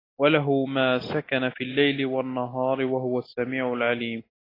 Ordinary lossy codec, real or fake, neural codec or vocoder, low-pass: AAC, 24 kbps; real; none; 5.4 kHz